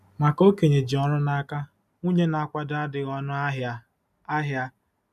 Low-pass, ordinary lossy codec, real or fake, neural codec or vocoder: 14.4 kHz; none; real; none